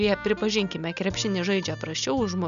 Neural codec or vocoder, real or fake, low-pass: none; real; 7.2 kHz